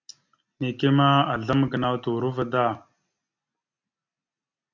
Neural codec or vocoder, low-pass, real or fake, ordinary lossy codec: none; 7.2 kHz; real; MP3, 64 kbps